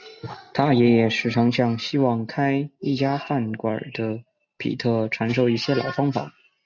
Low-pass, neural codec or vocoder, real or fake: 7.2 kHz; none; real